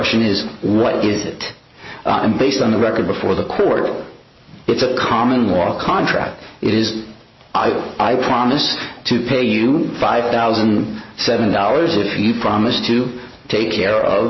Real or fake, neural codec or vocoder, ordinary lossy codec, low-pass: real; none; MP3, 24 kbps; 7.2 kHz